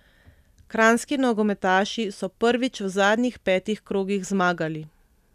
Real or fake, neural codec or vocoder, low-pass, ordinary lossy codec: real; none; 14.4 kHz; none